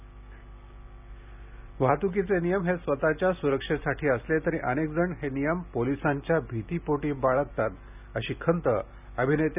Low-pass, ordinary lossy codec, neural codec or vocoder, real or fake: 3.6 kHz; none; none; real